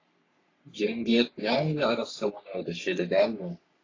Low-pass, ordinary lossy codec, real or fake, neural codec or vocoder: 7.2 kHz; AAC, 32 kbps; fake; codec, 44.1 kHz, 3.4 kbps, Pupu-Codec